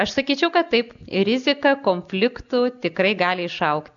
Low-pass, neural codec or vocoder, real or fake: 7.2 kHz; none; real